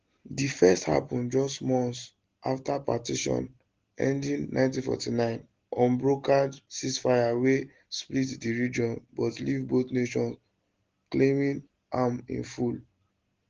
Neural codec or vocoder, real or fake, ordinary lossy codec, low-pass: none; real; Opus, 16 kbps; 7.2 kHz